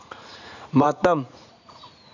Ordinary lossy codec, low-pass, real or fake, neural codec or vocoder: none; 7.2 kHz; fake; vocoder, 44.1 kHz, 80 mel bands, Vocos